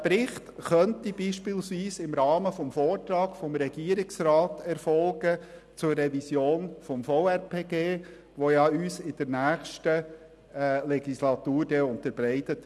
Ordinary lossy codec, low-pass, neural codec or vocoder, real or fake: none; none; none; real